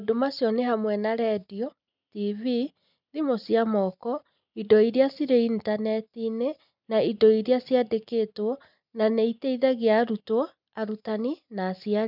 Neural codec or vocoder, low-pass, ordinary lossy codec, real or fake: none; 5.4 kHz; none; real